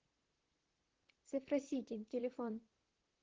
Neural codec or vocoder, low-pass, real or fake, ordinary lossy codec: vocoder, 44.1 kHz, 128 mel bands, Pupu-Vocoder; 7.2 kHz; fake; Opus, 16 kbps